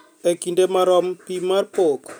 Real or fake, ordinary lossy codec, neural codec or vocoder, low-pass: real; none; none; none